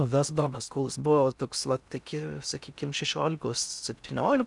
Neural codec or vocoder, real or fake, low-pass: codec, 16 kHz in and 24 kHz out, 0.6 kbps, FocalCodec, streaming, 2048 codes; fake; 10.8 kHz